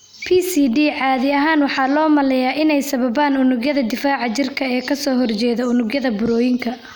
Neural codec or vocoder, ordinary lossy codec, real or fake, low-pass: none; none; real; none